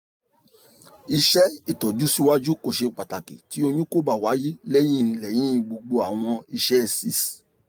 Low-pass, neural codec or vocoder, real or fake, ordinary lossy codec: none; none; real; none